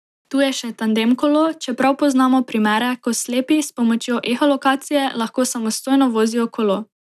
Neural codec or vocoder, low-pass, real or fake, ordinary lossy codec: none; 14.4 kHz; real; none